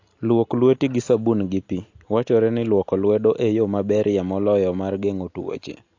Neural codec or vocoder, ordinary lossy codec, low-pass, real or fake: none; AAC, 48 kbps; 7.2 kHz; real